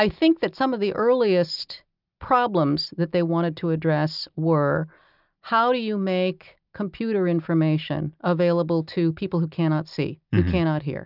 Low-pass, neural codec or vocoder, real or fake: 5.4 kHz; none; real